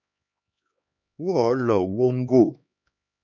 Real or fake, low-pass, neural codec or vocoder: fake; 7.2 kHz; codec, 16 kHz, 2 kbps, X-Codec, HuBERT features, trained on LibriSpeech